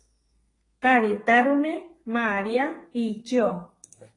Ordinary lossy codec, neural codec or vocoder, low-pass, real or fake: AAC, 32 kbps; codec, 32 kHz, 1.9 kbps, SNAC; 10.8 kHz; fake